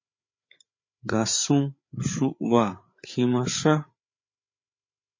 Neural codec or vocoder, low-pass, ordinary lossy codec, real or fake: codec, 16 kHz, 8 kbps, FreqCodec, larger model; 7.2 kHz; MP3, 32 kbps; fake